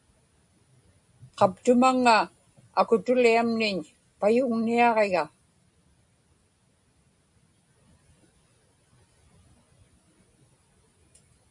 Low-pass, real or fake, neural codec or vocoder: 10.8 kHz; real; none